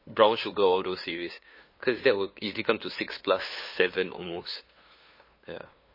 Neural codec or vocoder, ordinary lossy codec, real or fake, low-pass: codec, 16 kHz, 2 kbps, FunCodec, trained on LibriTTS, 25 frames a second; MP3, 24 kbps; fake; 5.4 kHz